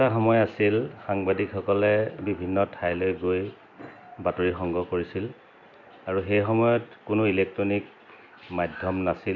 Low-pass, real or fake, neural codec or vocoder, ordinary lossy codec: 7.2 kHz; real; none; none